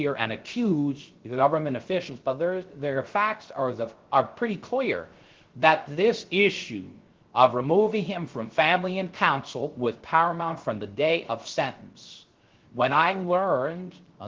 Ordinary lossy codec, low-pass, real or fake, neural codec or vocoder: Opus, 16 kbps; 7.2 kHz; fake; codec, 16 kHz, 0.3 kbps, FocalCodec